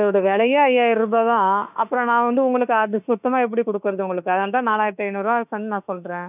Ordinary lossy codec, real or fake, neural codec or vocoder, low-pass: none; fake; autoencoder, 48 kHz, 32 numbers a frame, DAC-VAE, trained on Japanese speech; 3.6 kHz